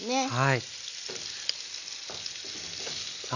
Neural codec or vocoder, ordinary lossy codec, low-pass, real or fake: none; none; 7.2 kHz; real